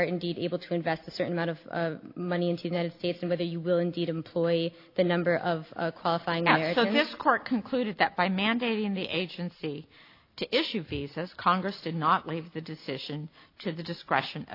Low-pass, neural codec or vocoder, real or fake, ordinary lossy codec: 5.4 kHz; none; real; AAC, 32 kbps